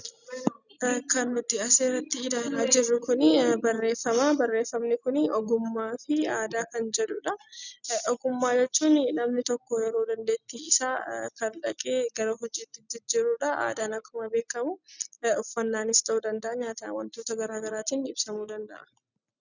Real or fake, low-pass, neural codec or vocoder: real; 7.2 kHz; none